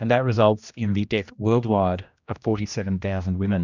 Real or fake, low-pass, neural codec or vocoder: fake; 7.2 kHz; codec, 16 kHz, 1 kbps, X-Codec, HuBERT features, trained on general audio